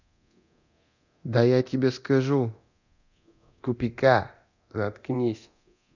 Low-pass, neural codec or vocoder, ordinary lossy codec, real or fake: 7.2 kHz; codec, 24 kHz, 0.9 kbps, DualCodec; none; fake